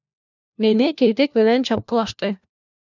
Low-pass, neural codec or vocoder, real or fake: 7.2 kHz; codec, 16 kHz, 1 kbps, FunCodec, trained on LibriTTS, 50 frames a second; fake